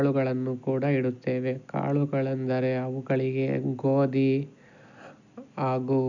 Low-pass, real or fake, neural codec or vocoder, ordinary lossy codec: 7.2 kHz; real; none; none